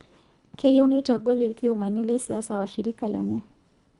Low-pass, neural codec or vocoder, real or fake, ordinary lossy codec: 10.8 kHz; codec, 24 kHz, 1.5 kbps, HILCodec; fake; Opus, 64 kbps